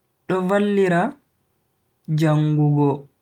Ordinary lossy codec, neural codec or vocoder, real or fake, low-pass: Opus, 32 kbps; none; real; 19.8 kHz